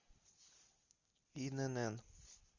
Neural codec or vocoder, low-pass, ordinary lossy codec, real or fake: none; 7.2 kHz; Opus, 64 kbps; real